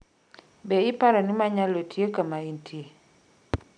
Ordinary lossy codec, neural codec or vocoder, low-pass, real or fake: none; none; 9.9 kHz; real